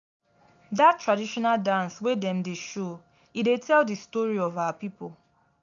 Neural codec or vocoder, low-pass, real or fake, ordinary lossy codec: none; 7.2 kHz; real; none